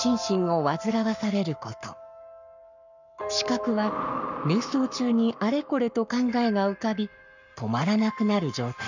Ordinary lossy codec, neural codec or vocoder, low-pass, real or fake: none; codec, 44.1 kHz, 7.8 kbps, Pupu-Codec; 7.2 kHz; fake